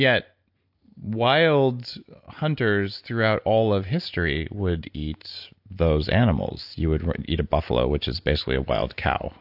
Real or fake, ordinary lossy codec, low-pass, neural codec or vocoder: real; AAC, 48 kbps; 5.4 kHz; none